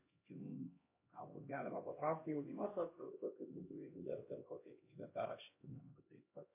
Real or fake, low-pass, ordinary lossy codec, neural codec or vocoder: fake; 3.6 kHz; MP3, 24 kbps; codec, 16 kHz, 1 kbps, X-Codec, HuBERT features, trained on LibriSpeech